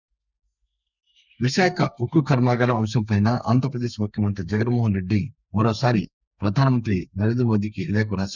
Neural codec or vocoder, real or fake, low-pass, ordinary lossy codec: codec, 32 kHz, 1.9 kbps, SNAC; fake; 7.2 kHz; none